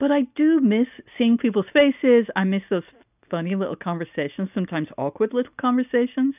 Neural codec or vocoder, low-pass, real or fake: none; 3.6 kHz; real